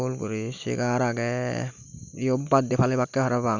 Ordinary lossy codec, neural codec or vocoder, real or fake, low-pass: none; none; real; 7.2 kHz